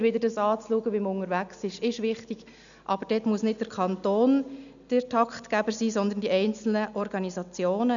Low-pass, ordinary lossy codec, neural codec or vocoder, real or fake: 7.2 kHz; none; none; real